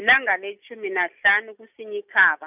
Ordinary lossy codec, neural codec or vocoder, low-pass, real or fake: none; none; 3.6 kHz; real